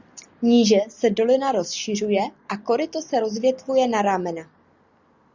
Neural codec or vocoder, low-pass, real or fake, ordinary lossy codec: none; 7.2 kHz; real; Opus, 64 kbps